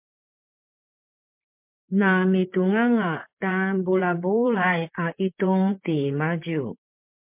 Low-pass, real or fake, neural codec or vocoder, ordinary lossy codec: 3.6 kHz; fake; vocoder, 44.1 kHz, 128 mel bands, Pupu-Vocoder; MP3, 32 kbps